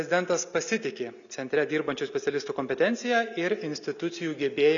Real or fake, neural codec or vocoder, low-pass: real; none; 7.2 kHz